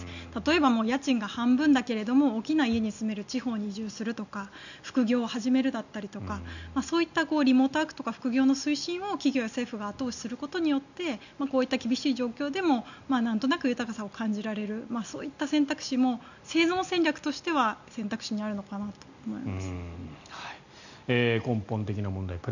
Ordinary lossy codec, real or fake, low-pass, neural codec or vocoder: none; real; 7.2 kHz; none